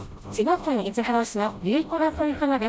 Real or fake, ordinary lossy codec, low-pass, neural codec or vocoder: fake; none; none; codec, 16 kHz, 0.5 kbps, FreqCodec, smaller model